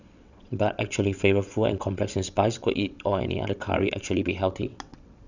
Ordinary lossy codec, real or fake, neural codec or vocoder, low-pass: none; fake; vocoder, 22.05 kHz, 80 mel bands, WaveNeXt; 7.2 kHz